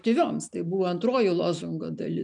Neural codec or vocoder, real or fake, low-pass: none; real; 10.8 kHz